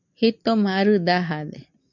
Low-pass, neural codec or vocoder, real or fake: 7.2 kHz; none; real